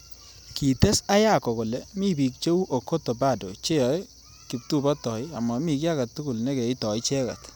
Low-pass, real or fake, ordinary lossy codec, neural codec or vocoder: none; real; none; none